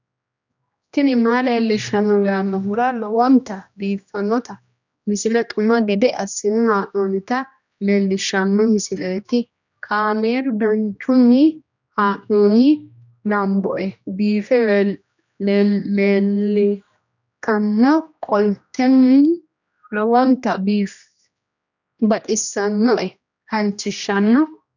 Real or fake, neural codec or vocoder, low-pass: fake; codec, 16 kHz, 1 kbps, X-Codec, HuBERT features, trained on general audio; 7.2 kHz